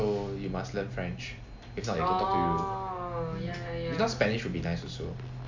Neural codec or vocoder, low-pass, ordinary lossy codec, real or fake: none; 7.2 kHz; MP3, 64 kbps; real